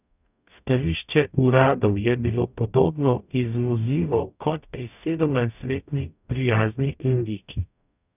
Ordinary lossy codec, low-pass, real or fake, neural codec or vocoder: none; 3.6 kHz; fake; codec, 44.1 kHz, 0.9 kbps, DAC